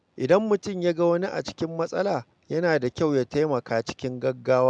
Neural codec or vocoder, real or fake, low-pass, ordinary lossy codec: none; real; 9.9 kHz; MP3, 96 kbps